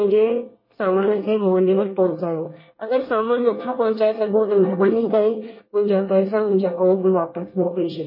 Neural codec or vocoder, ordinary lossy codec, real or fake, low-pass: codec, 24 kHz, 1 kbps, SNAC; MP3, 24 kbps; fake; 5.4 kHz